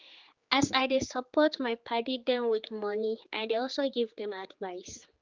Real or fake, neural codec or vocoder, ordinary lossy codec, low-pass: fake; codec, 16 kHz, 4 kbps, X-Codec, HuBERT features, trained on general audio; Opus, 32 kbps; 7.2 kHz